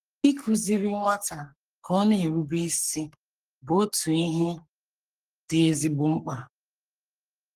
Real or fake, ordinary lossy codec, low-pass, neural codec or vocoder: fake; Opus, 24 kbps; 14.4 kHz; codec, 44.1 kHz, 3.4 kbps, Pupu-Codec